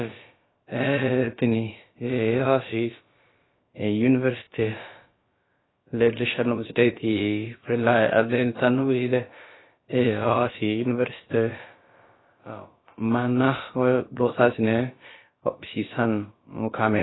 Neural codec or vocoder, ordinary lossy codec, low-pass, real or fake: codec, 16 kHz, about 1 kbps, DyCAST, with the encoder's durations; AAC, 16 kbps; 7.2 kHz; fake